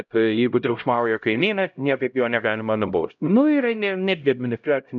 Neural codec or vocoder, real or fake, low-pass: codec, 16 kHz, 0.5 kbps, X-Codec, HuBERT features, trained on LibriSpeech; fake; 7.2 kHz